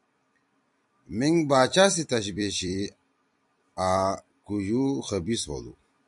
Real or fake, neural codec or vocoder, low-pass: fake; vocoder, 44.1 kHz, 128 mel bands every 256 samples, BigVGAN v2; 10.8 kHz